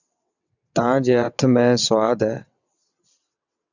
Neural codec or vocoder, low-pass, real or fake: vocoder, 22.05 kHz, 80 mel bands, WaveNeXt; 7.2 kHz; fake